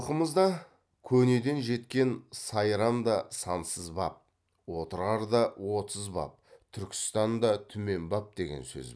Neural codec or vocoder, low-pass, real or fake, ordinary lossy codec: none; none; real; none